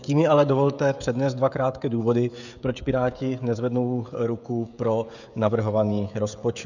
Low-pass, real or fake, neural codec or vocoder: 7.2 kHz; fake; codec, 16 kHz, 16 kbps, FreqCodec, smaller model